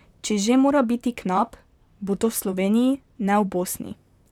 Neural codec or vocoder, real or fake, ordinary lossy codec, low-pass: vocoder, 44.1 kHz, 128 mel bands, Pupu-Vocoder; fake; none; 19.8 kHz